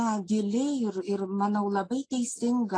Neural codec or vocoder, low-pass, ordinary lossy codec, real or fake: none; 9.9 kHz; AAC, 32 kbps; real